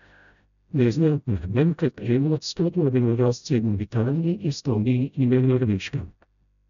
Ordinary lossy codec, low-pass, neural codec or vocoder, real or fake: none; 7.2 kHz; codec, 16 kHz, 0.5 kbps, FreqCodec, smaller model; fake